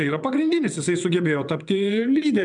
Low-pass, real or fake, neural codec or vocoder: 9.9 kHz; fake; vocoder, 22.05 kHz, 80 mel bands, WaveNeXt